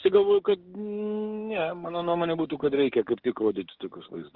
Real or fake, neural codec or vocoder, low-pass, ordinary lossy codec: fake; codec, 16 kHz, 6 kbps, DAC; 5.4 kHz; Opus, 16 kbps